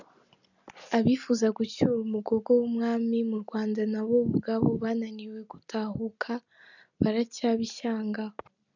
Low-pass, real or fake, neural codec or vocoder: 7.2 kHz; real; none